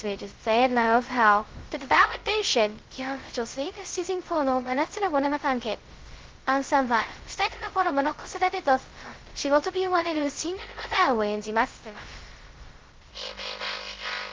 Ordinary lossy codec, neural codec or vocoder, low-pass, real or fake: Opus, 16 kbps; codec, 16 kHz, 0.2 kbps, FocalCodec; 7.2 kHz; fake